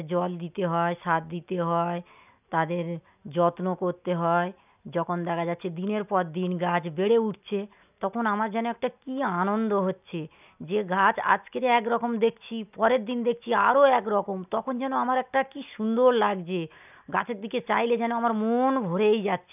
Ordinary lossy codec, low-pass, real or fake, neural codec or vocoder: none; 3.6 kHz; real; none